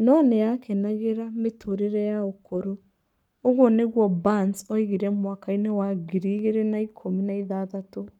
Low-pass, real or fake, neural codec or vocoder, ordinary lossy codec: 19.8 kHz; fake; codec, 44.1 kHz, 7.8 kbps, Pupu-Codec; none